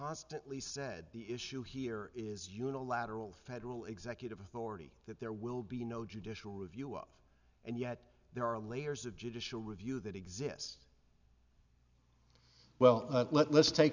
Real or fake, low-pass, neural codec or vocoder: real; 7.2 kHz; none